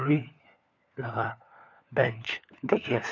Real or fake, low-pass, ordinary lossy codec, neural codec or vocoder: fake; 7.2 kHz; none; codec, 16 kHz, 4 kbps, FunCodec, trained on LibriTTS, 50 frames a second